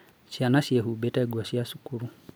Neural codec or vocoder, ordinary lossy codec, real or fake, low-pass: none; none; real; none